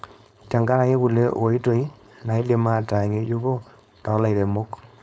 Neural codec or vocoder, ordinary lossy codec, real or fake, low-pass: codec, 16 kHz, 4.8 kbps, FACodec; none; fake; none